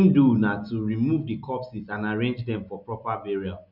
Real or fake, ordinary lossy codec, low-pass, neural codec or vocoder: real; none; 5.4 kHz; none